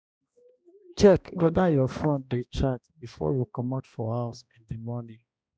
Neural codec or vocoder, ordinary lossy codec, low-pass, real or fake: codec, 16 kHz, 1 kbps, X-Codec, HuBERT features, trained on balanced general audio; none; none; fake